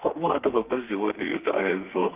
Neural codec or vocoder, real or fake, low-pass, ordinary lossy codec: codec, 32 kHz, 1.9 kbps, SNAC; fake; 3.6 kHz; Opus, 24 kbps